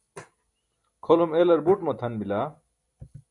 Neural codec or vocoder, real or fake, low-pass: none; real; 10.8 kHz